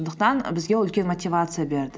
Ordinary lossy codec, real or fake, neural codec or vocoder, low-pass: none; real; none; none